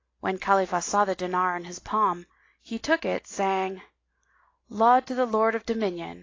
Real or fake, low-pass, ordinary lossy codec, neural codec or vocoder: real; 7.2 kHz; AAC, 32 kbps; none